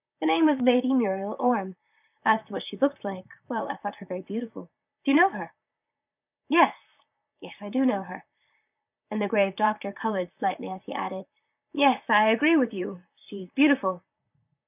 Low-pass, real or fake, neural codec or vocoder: 3.6 kHz; fake; vocoder, 44.1 kHz, 128 mel bands, Pupu-Vocoder